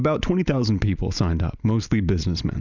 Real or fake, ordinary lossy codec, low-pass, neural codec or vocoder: real; Opus, 64 kbps; 7.2 kHz; none